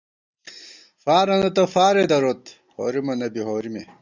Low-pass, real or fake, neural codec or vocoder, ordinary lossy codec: 7.2 kHz; real; none; Opus, 64 kbps